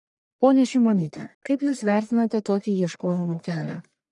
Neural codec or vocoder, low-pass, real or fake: codec, 44.1 kHz, 1.7 kbps, Pupu-Codec; 10.8 kHz; fake